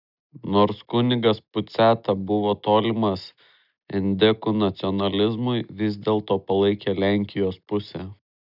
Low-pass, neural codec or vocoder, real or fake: 5.4 kHz; none; real